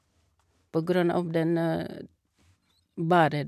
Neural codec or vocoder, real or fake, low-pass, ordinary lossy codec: none; real; 14.4 kHz; none